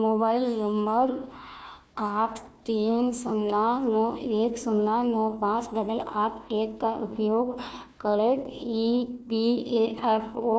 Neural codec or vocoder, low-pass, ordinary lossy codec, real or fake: codec, 16 kHz, 1 kbps, FunCodec, trained on Chinese and English, 50 frames a second; none; none; fake